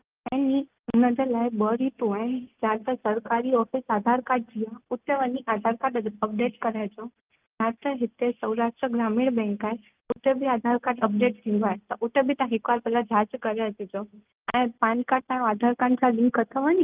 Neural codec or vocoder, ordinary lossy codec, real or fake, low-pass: none; Opus, 32 kbps; real; 3.6 kHz